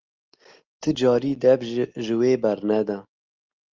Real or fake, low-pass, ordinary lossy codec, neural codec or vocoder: real; 7.2 kHz; Opus, 24 kbps; none